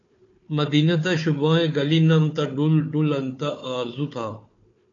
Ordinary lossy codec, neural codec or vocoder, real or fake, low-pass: AAC, 48 kbps; codec, 16 kHz, 4 kbps, FunCodec, trained on Chinese and English, 50 frames a second; fake; 7.2 kHz